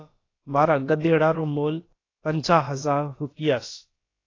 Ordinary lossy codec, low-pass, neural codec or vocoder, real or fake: AAC, 32 kbps; 7.2 kHz; codec, 16 kHz, about 1 kbps, DyCAST, with the encoder's durations; fake